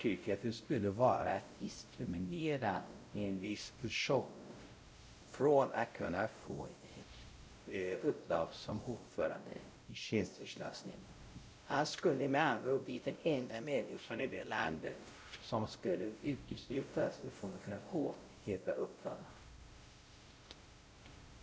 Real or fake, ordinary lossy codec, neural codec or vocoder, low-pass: fake; none; codec, 16 kHz, 0.5 kbps, X-Codec, WavLM features, trained on Multilingual LibriSpeech; none